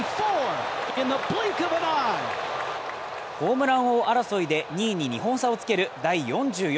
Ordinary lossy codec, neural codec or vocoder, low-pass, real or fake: none; none; none; real